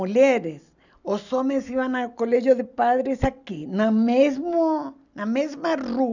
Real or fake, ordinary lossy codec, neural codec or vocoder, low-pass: real; none; none; 7.2 kHz